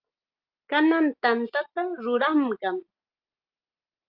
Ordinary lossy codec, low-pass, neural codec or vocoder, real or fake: Opus, 24 kbps; 5.4 kHz; none; real